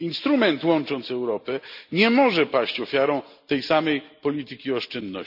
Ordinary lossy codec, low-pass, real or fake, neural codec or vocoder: none; 5.4 kHz; real; none